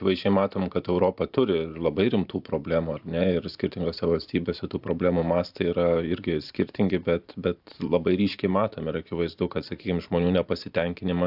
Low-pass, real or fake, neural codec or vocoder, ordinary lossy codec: 5.4 kHz; fake; vocoder, 44.1 kHz, 128 mel bands every 512 samples, BigVGAN v2; AAC, 48 kbps